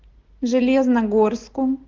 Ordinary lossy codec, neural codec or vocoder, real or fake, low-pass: Opus, 16 kbps; none; real; 7.2 kHz